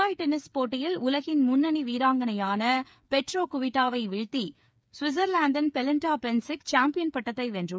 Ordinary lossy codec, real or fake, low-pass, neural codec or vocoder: none; fake; none; codec, 16 kHz, 8 kbps, FreqCodec, smaller model